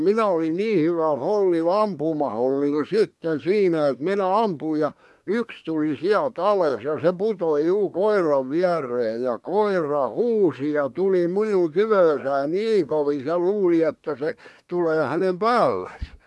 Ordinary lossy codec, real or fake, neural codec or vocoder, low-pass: none; fake; codec, 24 kHz, 1 kbps, SNAC; none